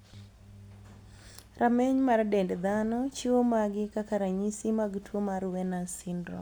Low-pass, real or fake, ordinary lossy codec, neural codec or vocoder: none; real; none; none